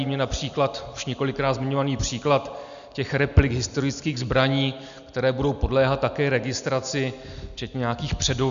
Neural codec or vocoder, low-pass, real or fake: none; 7.2 kHz; real